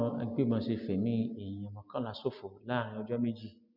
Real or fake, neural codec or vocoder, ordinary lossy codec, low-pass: real; none; none; 5.4 kHz